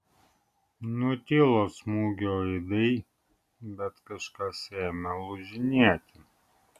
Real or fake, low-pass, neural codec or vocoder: real; 14.4 kHz; none